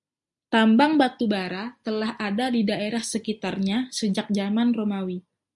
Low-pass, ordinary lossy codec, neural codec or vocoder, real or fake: 10.8 kHz; MP3, 96 kbps; none; real